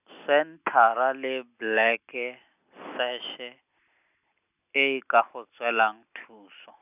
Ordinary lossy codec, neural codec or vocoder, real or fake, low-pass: none; none; real; 3.6 kHz